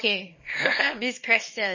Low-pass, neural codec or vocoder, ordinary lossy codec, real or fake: 7.2 kHz; codec, 24 kHz, 0.9 kbps, WavTokenizer, small release; MP3, 32 kbps; fake